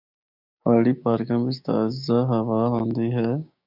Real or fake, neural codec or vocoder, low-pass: fake; codec, 16 kHz, 6 kbps, DAC; 5.4 kHz